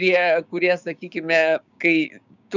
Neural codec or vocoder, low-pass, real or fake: codec, 16 kHz, 6 kbps, DAC; 7.2 kHz; fake